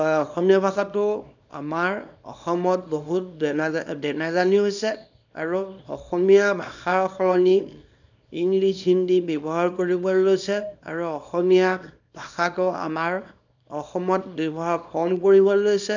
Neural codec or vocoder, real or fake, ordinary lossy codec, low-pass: codec, 24 kHz, 0.9 kbps, WavTokenizer, small release; fake; none; 7.2 kHz